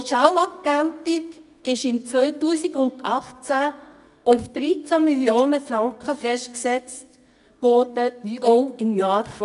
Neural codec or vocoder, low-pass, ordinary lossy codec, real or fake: codec, 24 kHz, 0.9 kbps, WavTokenizer, medium music audio release; 10.8 kHz; none; fake